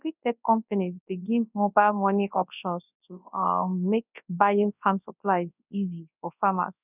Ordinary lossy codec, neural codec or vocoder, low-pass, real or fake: none; codec, 24 kHz, 0.9 kbps, WavTokenizer, large speech release; 3.6 kHz; fake